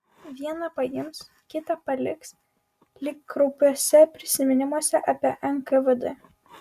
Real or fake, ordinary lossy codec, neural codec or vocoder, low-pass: real; Opus, 64 kbps; none; 14.4 kHz